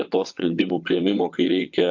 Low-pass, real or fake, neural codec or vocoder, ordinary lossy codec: 7.2 kHz; fake; vocoder, 22.05 kHz, 80 mel bands, WaveNeXt; AAC, 48 kbps